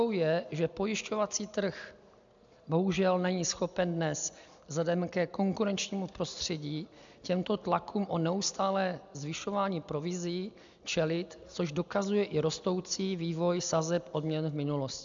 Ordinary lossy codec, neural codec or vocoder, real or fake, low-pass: MP3, 64 kbps; none; real; 7.2 kHz